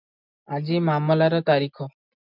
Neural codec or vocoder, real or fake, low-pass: none; real; 5.4 kHz